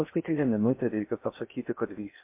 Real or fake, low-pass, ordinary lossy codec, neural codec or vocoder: fake; 3.6 kHz; MP3, 24 kbps; codec, 16 kHz in and 24 kHz out, 0.6 kbps, FocalCodec, streaming, 4096 codes